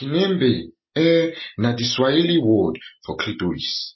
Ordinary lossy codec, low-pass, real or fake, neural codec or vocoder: MP3, 24 kbps; 7.2 kHz; real; none